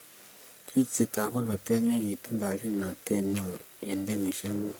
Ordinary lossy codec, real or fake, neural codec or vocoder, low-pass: none; fake; codec, 44.1 kHz, 1.7 kbps, Pupu-Codec; none